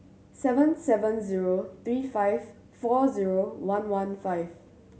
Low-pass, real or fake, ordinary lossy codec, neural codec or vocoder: none; real; none; none